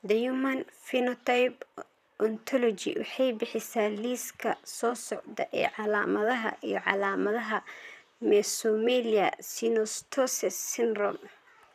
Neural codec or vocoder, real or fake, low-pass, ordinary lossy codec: vocoder, 44.1 kHz, 128 mel bands, Pupu-Vocoder; fake; 14.4 kHz; none